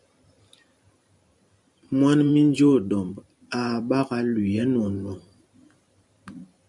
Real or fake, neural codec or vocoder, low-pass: fake; vocoder, 44.1 kHz, 128 mel bands every 512 samples, BigVGAN v2; 10.8 kHz